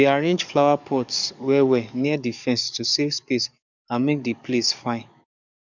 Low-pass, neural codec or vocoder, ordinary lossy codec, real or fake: 7.2 kHz; codec, 44.1 kHz, 7.8 kbps, DAC; none; fake